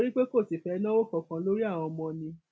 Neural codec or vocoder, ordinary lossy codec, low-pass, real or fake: none; none; none; real